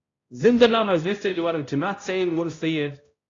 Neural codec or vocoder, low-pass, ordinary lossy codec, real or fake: codec, 16 kHz, 0.5 kbps, X-Codec, HuBERT features, trained on balanced general audio; 7.2 kHz; AAC, 32 kbps; fake